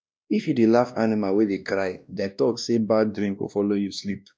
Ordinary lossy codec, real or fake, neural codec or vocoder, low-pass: none; fake; codec, 16 kHz, 1 kbps, X-Codec, WavLM features, trained on Multilingual LibriSpeech; none